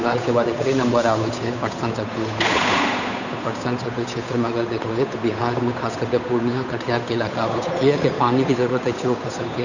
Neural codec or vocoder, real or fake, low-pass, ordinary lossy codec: codec, 16 kHz, 8 kbps, FunCodec, trained on Chinese and English, 25 frames a second; fake; 7.2 kHz; none